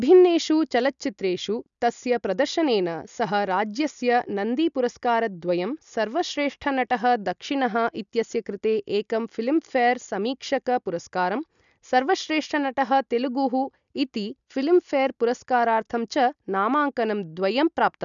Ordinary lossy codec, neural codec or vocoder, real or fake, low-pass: none; none; real; 7.2 kHz